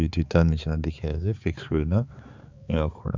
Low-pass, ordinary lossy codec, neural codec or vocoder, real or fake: 7.2 kHz; Opus, 64 kbps; codec, 16 kHz, 4 kbps, X-Codec, HuBERT features, trained on balanced general audio; fake